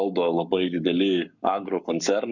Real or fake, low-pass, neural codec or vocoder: fake; 7.2 kHz; codec, 44.1 kHz, 7.8 kbps, Pupu-Codec